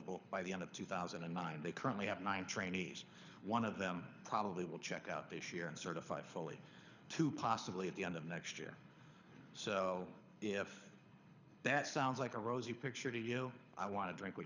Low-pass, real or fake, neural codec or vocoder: 7.2 kHz; fake; codec, 24 kHz, 6 kbps, HILCodec